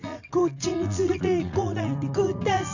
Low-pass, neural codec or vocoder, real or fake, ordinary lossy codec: 7.2 kHz; vocoder, 44.1 kHz, 80 mel bands, Vocos; fake; none